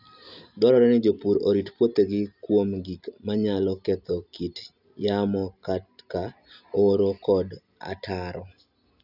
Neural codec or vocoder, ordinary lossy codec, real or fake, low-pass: none; none; real; 5.4 kHz